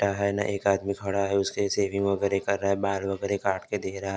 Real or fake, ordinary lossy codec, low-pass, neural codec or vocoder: real; none; none; none